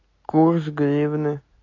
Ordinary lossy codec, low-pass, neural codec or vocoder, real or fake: AAC, 32 kbps; 7.2 kHz; none; real